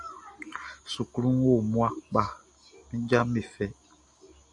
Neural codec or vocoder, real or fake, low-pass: none; real; 10.8 kHz